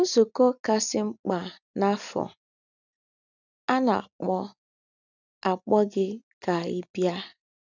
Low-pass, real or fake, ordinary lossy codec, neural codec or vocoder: 7.2 kHz; real; none; none